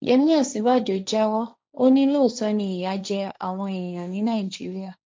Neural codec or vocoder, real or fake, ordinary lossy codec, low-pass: codec, 16 kHz, 1.1 kbps, Voila-Tokenizer; fake; none; none